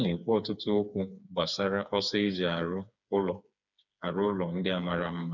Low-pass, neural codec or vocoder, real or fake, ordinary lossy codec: 7.2 kHz; codec, 16 kHz, 4 kbps, FreqCodec, smaller model; fake; none